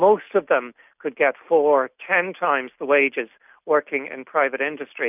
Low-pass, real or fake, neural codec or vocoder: 3.6 kHz; real; none